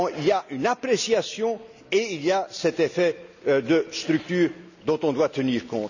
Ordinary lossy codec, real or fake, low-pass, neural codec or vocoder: none; real; 7.2 kHz; none